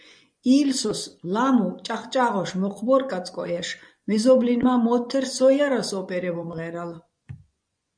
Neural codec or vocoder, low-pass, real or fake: vocoder, 24 kHz, 100 mel bands, Vocos; 9.9 kHz; fake